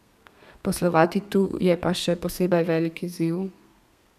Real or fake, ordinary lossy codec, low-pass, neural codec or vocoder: fake; none; 14.4 kHz; codec, 32 kHz, 1.9 kbps, SNAC